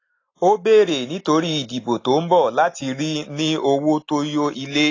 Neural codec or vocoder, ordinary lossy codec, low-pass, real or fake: none; AAC, 32 kbps; 7.2 kHz; real